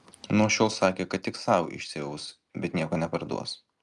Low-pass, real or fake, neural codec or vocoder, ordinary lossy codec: 10.8 kHz; real; none; Opus, 32 kbps